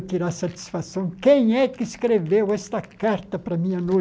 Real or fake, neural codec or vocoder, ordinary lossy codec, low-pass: real; none; none; none